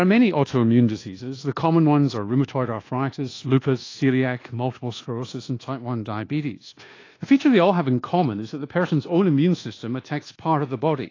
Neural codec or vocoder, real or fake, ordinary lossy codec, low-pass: codec, 24 kHz, 1.2 kbps, DualCodec; fake; AAC, 32 kbps; 7.2 kHz